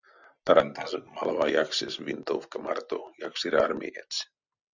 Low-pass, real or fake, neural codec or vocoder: 7.2 kHz; real; none